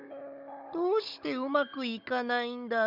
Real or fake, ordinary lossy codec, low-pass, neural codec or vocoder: fake; none; 5.4 kHz; codec, 16 kHz, 16 kbps, FunCodec, trained on Chinese and English, 50 frames a second